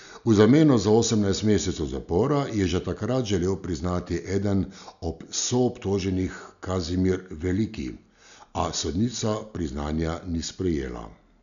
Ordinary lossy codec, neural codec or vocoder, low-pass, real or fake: none; none; 7.2 kHz; real